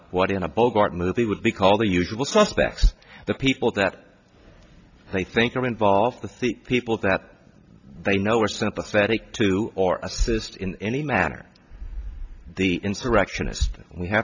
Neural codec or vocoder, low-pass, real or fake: none; 7.2 kHz; real